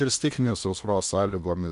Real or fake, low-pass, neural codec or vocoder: fake; 10.8 kHz; codec, 16 kHz in and 24 kHz out, 0.8 kbps, FocalCodec, streaming, 65536 codes